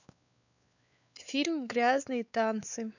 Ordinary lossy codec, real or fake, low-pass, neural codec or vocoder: none; fake; 7.2 kHz; codec, 16 kHz, 4 kbps, X-Codec, WavLM features, trained on Multilingual LibriSpeech